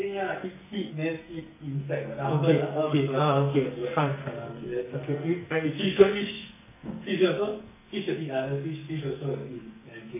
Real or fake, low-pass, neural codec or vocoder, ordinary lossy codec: fake; 3.6 kHz; codec, 44.1 kHz, 2.6 kbps, SNAC; none